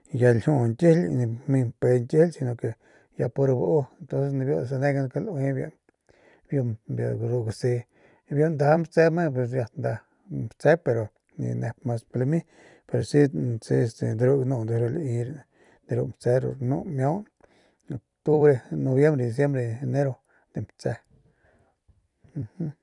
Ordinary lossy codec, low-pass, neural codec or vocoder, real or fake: none; 10.8 kHz; vocoder, 44.1 kHz, 128 mel bands every 256 samples, BigVGAN v2; fake